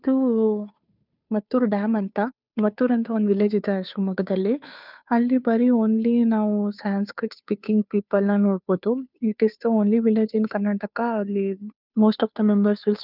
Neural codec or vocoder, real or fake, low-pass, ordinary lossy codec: codec, 16 kHz, 2 kbps, FunCodec, trained on Chinese and English, 25 frames a second; fake; 5.4 kHz; none